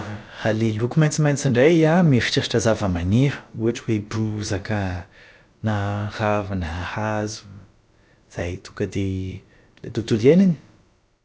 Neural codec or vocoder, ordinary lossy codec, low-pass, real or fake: codec, 16 kHz, about 1 kbps, DyCAST, with the encoder's durations; none; none; fake